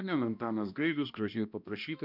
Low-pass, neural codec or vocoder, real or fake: 5.4 kHz; codec, 16 kHz, 1 kbps, X-Codec, HuBERT features, trained on balanced general audio; fake